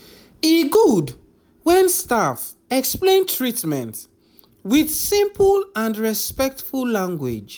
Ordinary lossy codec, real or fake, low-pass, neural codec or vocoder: none; real; none; none